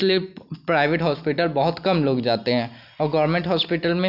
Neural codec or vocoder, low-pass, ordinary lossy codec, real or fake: none; 5.4 kHz; none; real